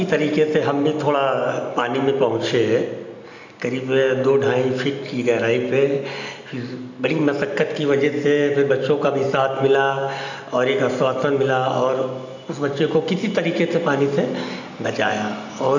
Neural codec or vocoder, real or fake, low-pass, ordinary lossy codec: none; real; 7.2 kHz; none